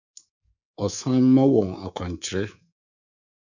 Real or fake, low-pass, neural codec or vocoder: fake; 7.2 kHz; codec, 24 kHz, 3.1 kbps, DualCodec